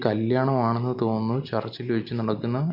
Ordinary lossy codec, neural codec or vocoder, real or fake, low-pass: none; none; real; 5.4 kHz